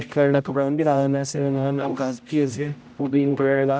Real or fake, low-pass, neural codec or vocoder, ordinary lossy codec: fake; none; codec, 16 kHz, 0.5 kbps, X-Codec, HuBERT features, trained on general audio; none